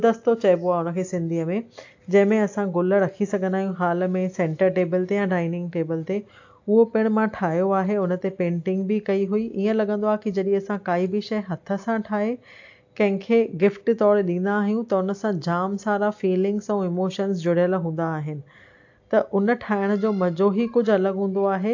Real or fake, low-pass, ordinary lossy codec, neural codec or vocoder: real; 7.2 kHz; AAC, 48 kbps; none